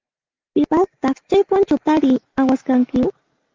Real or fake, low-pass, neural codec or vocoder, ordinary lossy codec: real; 7.2 kHz; none; Opus, 32 kbps